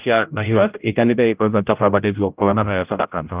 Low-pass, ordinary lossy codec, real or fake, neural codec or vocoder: 3.6 kHz; Opus, 24 kbps; fake; codec, 16 kHz, 0.5 kbps, X-Codec, HuBERT features, trained on general audio